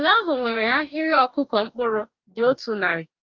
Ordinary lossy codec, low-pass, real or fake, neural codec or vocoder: Opus, 24 kbps; 7.2 kHz; fake; codec, 44.1 kHz, 2.6 kbps, DAC